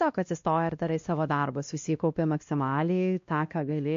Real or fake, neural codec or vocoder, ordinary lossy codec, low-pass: fake; codec, 16 kHz, 1 kbps, X-Codec, WavLM features, trained on Multilingual LibriSpeech; MP3, 48 kbps; 7.2 kHz